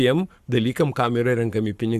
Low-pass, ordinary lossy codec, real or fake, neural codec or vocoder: 14.4 kHz; Opus, 64 kbps; fake; codec, 44.1 kHz, 7.8 kbps, Pupu-Codec